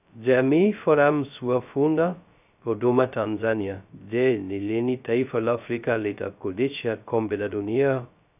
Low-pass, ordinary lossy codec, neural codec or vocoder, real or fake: 3.6 kHz; none; codec, 16 kHz, 0.2 kbps, FocalCodec; fake